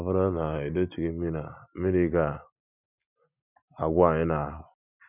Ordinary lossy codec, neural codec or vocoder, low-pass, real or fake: none; none; 3.6 kHz; real